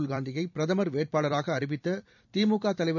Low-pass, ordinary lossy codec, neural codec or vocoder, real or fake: 7.2 kHz; none; vocoder, 44.1 kHz, 128 mel bands every 256 samples, BigVGAN v2; fake